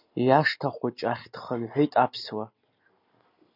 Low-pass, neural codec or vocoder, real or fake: 5.4 kHz; none; real